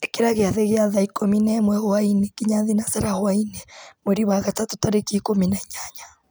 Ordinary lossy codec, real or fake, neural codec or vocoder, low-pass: none; real; none; none